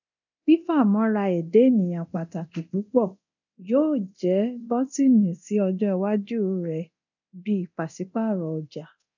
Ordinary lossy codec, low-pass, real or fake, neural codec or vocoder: none; 7.2 kHz; fake; codec, 24 kHz, 0.9 kbps, DualCodec